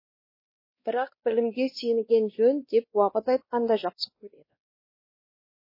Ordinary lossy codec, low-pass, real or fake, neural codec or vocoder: MP3, 24 kbps; 5.4 kHz; fake; codec, 16 kHz, 1 kbps, X-Codec, WavLM features, trained on Multilingual LibriSpeech